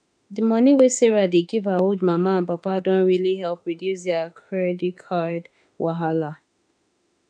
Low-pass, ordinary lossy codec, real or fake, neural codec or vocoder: 9.9 kHz; none; fake; autoencoder, 48 kHz, 32 numbers a frame, DAC-VAE, trained on Japanese speech